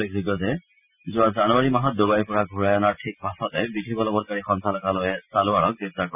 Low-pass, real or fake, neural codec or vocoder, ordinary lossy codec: 3.6 kHz; real; none; none